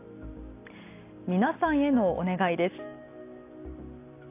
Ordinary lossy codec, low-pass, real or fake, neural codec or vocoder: AAC, 24 kbps; 3.6 kHz; real; none